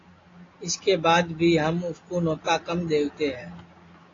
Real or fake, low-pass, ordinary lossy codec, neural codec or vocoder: real; 7.2 kHz; AAC, 32 kbps; none